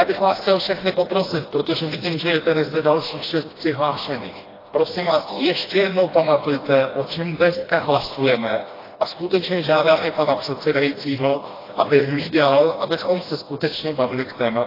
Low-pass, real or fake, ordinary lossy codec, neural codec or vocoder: 5.4 kHz; fake; AAC, 24 kbps; codec, 16 kHz, 1 kbps, FreqCodec, smaller model